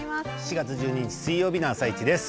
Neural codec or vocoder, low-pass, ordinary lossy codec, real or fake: none; none; none; real